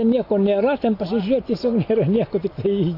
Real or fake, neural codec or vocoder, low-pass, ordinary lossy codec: real; none; 5.4 kHz; AAC, 32 kbps